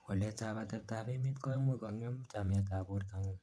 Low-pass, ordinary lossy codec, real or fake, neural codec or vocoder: none; none; fake; vocoder, 22.05 kHz, 80 mel bands, WaveNeXt